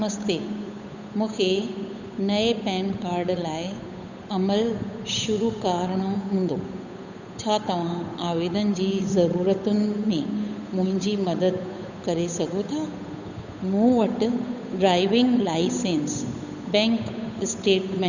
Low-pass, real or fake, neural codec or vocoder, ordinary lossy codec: 7.2 kHz; fake; codec, 16 kHz, 8 kbps, FunCodec, trained on Chinese and English, 25 frames a second; none